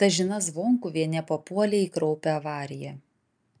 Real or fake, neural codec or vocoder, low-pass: real; none; 9.9 kHz